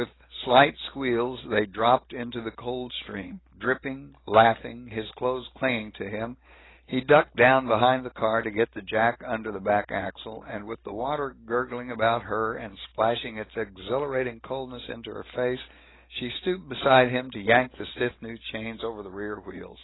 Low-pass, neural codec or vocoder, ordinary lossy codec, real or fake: 7.2 kHz; none; AAC, 16 kbps; real